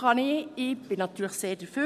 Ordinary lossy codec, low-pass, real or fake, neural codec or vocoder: none; 14.4 kHz; real; none